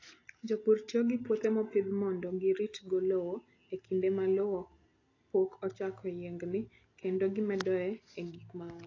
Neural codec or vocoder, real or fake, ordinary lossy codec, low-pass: none; real; AAC, 32 kbps; 7.2 kHz